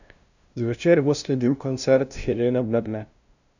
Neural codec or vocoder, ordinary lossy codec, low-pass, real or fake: codec, 16 kHz, 0.5 kbps, FunCodec, trained on LibriTTS, 25 frames a second; none; 7.2 kHz; fake